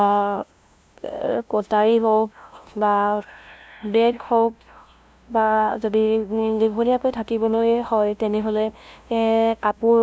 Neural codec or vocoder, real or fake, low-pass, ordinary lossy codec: codec, 16 kHz, 0.5 kbps, FunCodec, trained on LibriTTS, 25 frames a second; fake; none; none